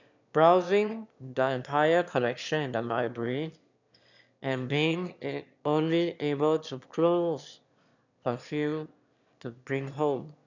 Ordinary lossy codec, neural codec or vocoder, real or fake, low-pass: none; autoencoder, 22.05 kHz, a latent of 192 numbers a frame, VITS, trained on one speaker; fake; 7.2 kHz